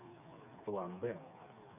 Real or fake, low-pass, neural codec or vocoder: fake; 3.6 kHz; codec, 16 kHz, 4 kbps, FreqCodec, smaller model